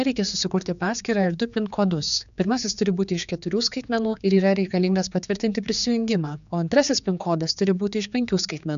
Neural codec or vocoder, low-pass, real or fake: codec, 16 kHz, 4 kbps, X-Codec, HuBERT features, trained on general audio; 7.2 kHz; fake